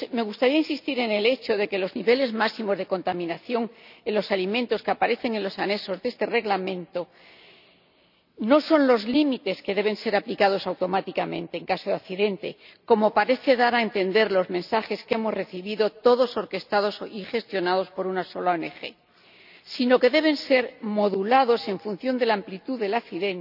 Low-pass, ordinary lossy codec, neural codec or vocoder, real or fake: 5.4 kHz; none; none; real